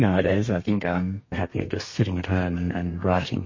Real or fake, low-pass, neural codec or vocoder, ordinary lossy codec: fake; 7.2 kHz; codec, 32 kHz, 1.9 kbps, SNAC; MP3, 32 kbps